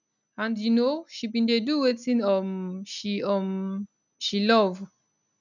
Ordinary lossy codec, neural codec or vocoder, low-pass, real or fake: none; none; 7.2 kHz; real